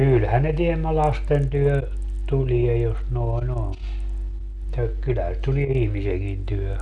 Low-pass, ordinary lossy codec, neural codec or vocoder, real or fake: 10.8 kHz; none; none; real